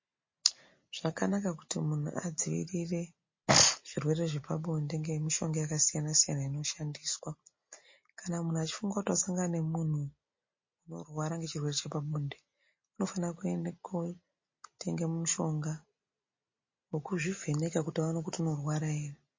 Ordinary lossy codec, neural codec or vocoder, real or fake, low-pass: MP3, 32 kbps; none; real; 7.2 kHz